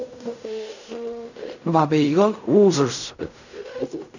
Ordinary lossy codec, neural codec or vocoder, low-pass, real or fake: none; codec, 16 kHz in and 24 kHz out, 0.4 kbps, LongCat-Audio-Codec, fine tuned four codebook decoder; 7.2 kHz; fake